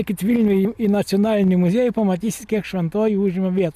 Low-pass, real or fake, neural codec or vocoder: 14.4 kHz; real; none